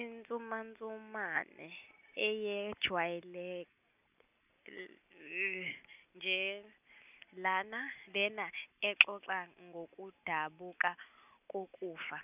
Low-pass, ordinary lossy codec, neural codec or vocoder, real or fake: 3.6 kHz; none; none; real